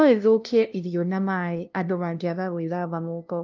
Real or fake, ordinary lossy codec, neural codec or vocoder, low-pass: fake; Opus, 32 kbps; codec, 16 kHz, 0.5 kbps, FunCodec, trained on LibriTTS, 25 frames a second; 7.2 kHz